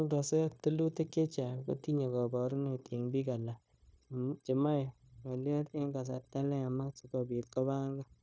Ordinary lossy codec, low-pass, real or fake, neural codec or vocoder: none; none; fake; codec, 16 kHz, 0.9 kbps, LongCat-Audio-Codec